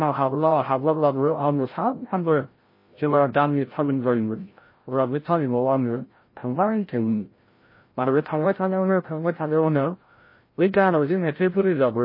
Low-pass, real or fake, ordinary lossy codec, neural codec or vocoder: 5.4 kHz; fake; MP3, 24 kbps; codec, 16 kHz, 0.5 kbps, FreqCodec, larger model